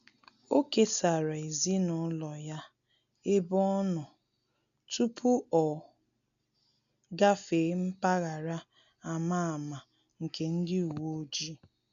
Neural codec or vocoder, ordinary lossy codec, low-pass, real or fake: none; none; 7.2 kHz; real